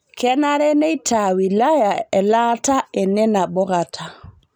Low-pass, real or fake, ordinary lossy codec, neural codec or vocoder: none; real; none; none